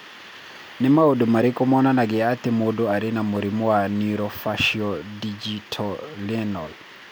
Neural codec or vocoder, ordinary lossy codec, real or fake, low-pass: none; none; real; none